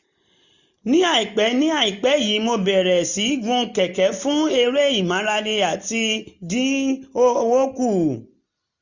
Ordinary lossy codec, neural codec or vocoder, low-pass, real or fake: none; none; 7.2 kHz; real